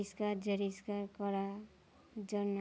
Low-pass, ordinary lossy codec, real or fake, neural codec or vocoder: none; none; real; none